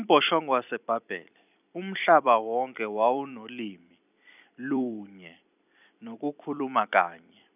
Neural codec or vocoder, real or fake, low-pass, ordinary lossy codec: vocoder, 44.1 kHz, 128 mel bands every 256 samples, BigVGAN v2; fake; 3.6 kHz; none